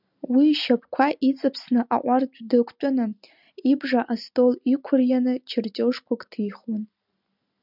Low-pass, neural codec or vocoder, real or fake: 5.4 kHz; none; real